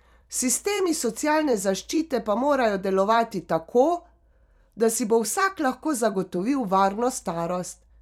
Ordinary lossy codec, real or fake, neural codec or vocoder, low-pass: none; fake; vocoder, 44.1 kHz, 128 mel bands every 256 samples, BigVGAN v2; 19.8 kHz